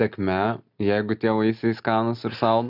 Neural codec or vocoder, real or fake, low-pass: none; real; 5.4 kHz